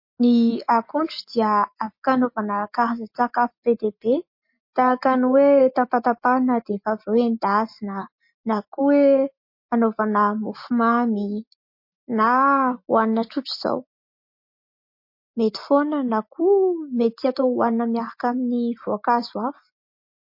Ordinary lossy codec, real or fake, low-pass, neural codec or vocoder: MP3, 32 kbps; real; 5.4 kHz; none